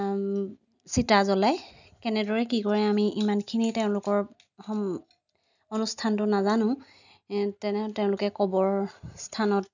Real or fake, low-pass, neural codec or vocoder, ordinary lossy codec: real; 7.2 kHz; none; none